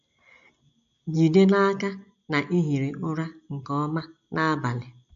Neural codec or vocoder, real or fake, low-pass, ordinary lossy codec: none; real; 7.2 kHz; none